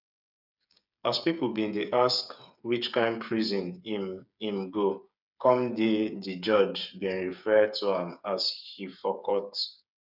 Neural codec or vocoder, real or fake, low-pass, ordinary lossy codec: codec, 16 kHz, 8 kbps, FreqCodec, smaller model; fake; 5.4 kHz; none